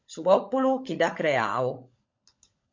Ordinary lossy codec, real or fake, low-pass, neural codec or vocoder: MP3, 48 kbps; fake; 7.2 kHz; codec, 16 kHz, 16 kbps, FunCodec, trained on LibriTTS, 50 frames a second